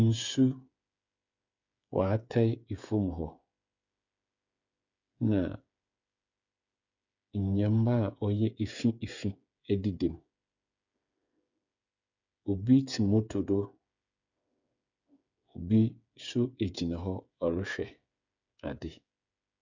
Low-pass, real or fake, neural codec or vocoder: 7.2 kHz; fake; codec, 16 kHz, 8 kbps, FreqCodec, smaller model